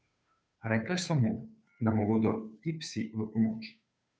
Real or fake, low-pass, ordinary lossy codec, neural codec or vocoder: fake; none; none; codec, 16 kHz, 2 kbps, FunCodec, trained on Chinese and English, 25 frames a second